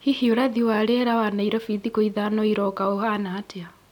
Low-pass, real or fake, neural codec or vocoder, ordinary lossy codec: 19.8 kHz; real; none; none